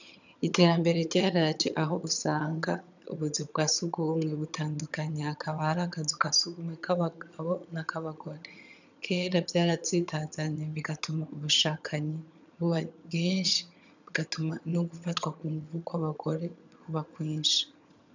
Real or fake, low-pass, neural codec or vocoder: fake; 7.2 kHz; vocoder, 22.05 kHz, 80 mel bands, HiFi-GAN